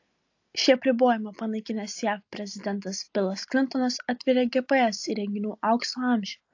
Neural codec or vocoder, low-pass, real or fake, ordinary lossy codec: none; 7.2 kHz; real; AAC, 48 kbps